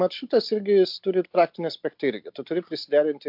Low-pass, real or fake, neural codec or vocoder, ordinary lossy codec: 5.4 kHz; real; none; MP3, 48 kbps